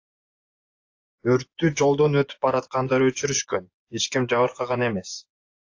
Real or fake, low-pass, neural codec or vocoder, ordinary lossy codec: fake; 7.2 kHz; vocoder, 24 kHz, 100 mel bands, Vocos; AAC, 48 kbps